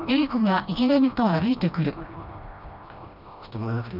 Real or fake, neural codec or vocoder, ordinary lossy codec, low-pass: fake; codec, 16 kHz, 1 kbps, FreqCodec, smaller model; none; 5.4 kHz